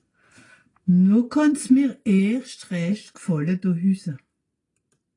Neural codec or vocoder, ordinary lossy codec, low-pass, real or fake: none; AAC, 32 kbps; 10.8 kHz; real